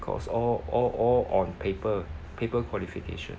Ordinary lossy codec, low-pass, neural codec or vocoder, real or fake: none; none; none; real